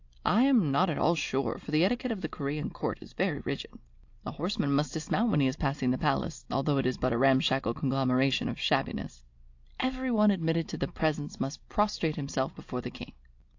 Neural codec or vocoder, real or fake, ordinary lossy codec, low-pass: none; real; AAC, 48 kbps; 7.2 kHz